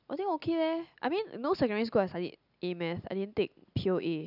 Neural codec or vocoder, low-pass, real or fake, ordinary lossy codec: none; 5.4 kHz; real; none